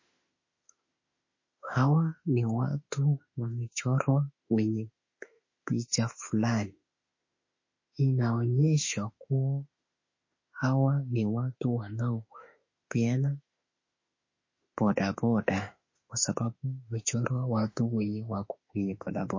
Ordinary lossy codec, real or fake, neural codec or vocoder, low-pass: MP3, 32 kbps; fake; autoencoder, 48 kHz, 32 numbers a frame, DAC-VAE, trained on Japanese speech; 7.2 kHz